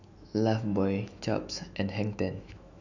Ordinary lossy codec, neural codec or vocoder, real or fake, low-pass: none; autoencoder, 48 kHz, 128 numbers a frame, DAC-VAE, trained on Japanese speech; fake; 7.2 kHz